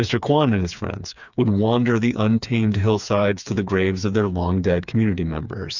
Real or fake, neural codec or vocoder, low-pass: fake; codec, 16 kHz, 4 kbps, FreqCodec, smaller model; 7.2 kHz